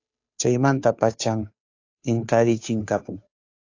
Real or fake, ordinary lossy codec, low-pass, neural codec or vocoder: fake; AAC, 48 kbps; 7.2 kHz; codec, 16 kHz, 2 kbps, FunCodec, trained on Chinese and English, 25 frames a second